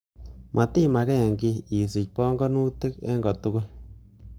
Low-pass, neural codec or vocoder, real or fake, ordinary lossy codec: none; codec, 44.1 kHz, 7.8 kbps, Pupu-Codec; fake; none